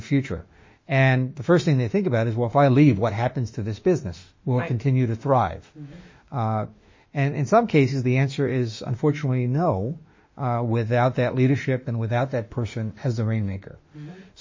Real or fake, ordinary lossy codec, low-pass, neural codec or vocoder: fake; MP3, 32 kbps; 7.2 kHz; autoencoder, 48 kHz, 32 numbers a frame, DAC-VAE, trained on Japanese speech